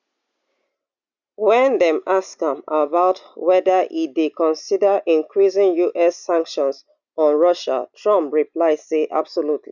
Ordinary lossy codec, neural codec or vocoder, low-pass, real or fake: none; none; 7.2 kHz; real